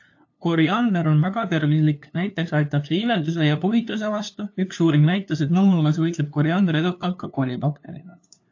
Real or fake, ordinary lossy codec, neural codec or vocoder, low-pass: fake; AAC, 48 kbps; codec, 16 kHz, 2 kbps, FunCodec, trained on LibriTTS, 25 frames a second; 7.2 kHz